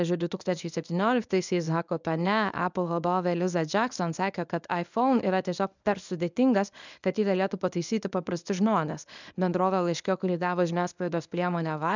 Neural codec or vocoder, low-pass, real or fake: codec, 24 kHz, 0.9 kbps, WavTokenizer, medium speech release version 1; 7.2 kHz; fake